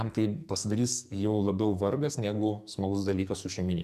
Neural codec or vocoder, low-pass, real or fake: codec, 44.1 kHz, 2.6 kbps, SNAC; 14.4 kHz; fake